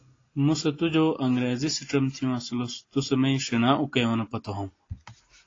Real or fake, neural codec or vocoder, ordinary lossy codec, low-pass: real; none; AAC, 32 kbps; 7.2 kHz